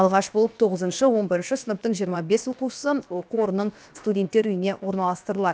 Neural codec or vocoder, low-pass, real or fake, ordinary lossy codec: codec, 16 kHz, about 1 kbps, DyCAST, with the encoder's durations; none; fake; none